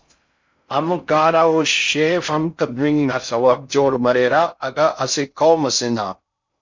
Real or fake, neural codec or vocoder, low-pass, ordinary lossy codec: fake; codec, 16 kHz in and 24 kHz out, 0.6 kbps, FocalCodec, streaming, 4096 codes; 7.2 kHz; MP3, 48 kbps